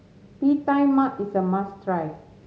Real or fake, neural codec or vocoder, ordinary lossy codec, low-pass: real; none; none; none